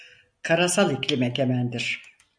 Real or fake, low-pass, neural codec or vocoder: real; 9.9 kHz; none